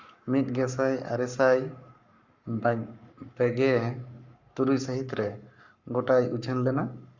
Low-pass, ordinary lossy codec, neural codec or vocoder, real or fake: 7.2 kHz; Opus, 64 kbps; codec, 44.1 kHz, 7.8 kbps, Pupu-Codec; fake